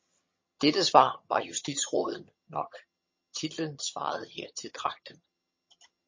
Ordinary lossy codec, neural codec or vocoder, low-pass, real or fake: MP3, 32 kbps; vocoder, 22.05 kHz, 80 mel bands, HiFi-GAN; 7.2 kHz; fake